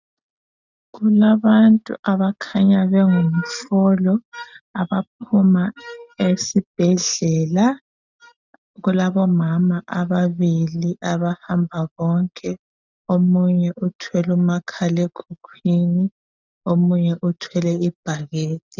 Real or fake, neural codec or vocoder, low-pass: real; none; 7.2 kHz